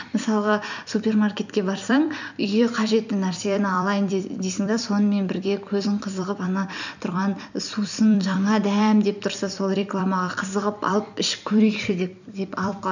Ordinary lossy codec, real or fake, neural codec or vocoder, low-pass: none; fake; vocoder, 44.1 kHz, 128 mel bands every 256 samples, BigVGAN v2; 7.2 kHz